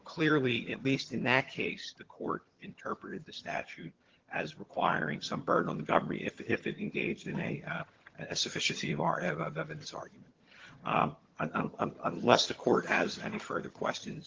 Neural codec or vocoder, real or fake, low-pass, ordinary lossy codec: vocoder, 22.05 kHz, 80 mel bands, HiFi-GAN; fake; 7.2 kHz; Opus, 16 kbps